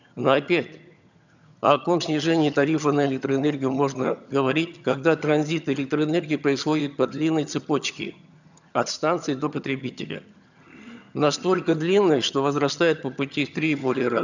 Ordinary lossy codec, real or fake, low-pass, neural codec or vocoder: none; fake; 7.2 kHz; vocoder, 22.05 kHz, 80 mel bands, HiFi-GAN